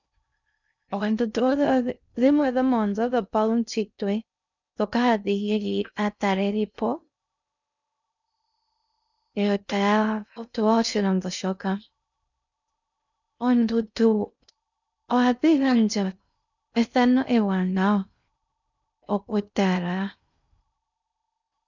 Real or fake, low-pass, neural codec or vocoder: fake; 7.2 kHz; codec, 16 kHz in and 24 kHz out, 0.6 kbps, FocalCodec, streaming, 2048 codes